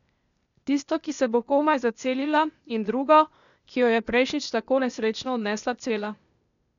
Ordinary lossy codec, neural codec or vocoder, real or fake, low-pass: none; codec, 16 kHz, 0.8 kbps, ZipCodec; fake; 7.2 kHz